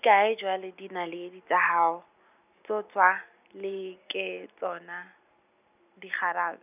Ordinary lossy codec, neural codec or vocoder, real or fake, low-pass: none; none; real; 3.6 kHz